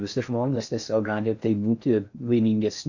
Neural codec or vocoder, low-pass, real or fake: codec, 16 kHz in and 24 kHz out, 0.6 kbps, FocalCodec, streaming, 4096 codes; 7.2 kHz; fake